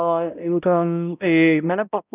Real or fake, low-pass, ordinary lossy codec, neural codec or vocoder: fake; 3.6 kHz; none; codec, 16 kHz, 0.5 kbps, X-Codec, HuBERT features, trained on balanced general audio